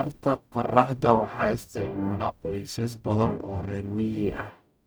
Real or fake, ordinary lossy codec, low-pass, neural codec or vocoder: fake; none; none; codec, 44.1 kHz, 0.9 kbps, DAC